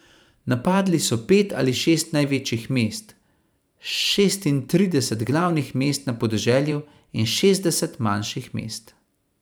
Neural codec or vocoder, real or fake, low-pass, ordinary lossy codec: vocoder, 44.1 kHz, 128 mel bands every 512 samples, BigVGAN v2; fake; none; none